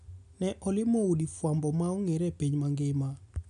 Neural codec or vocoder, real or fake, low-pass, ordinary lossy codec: none; real; 10.8 kHz; none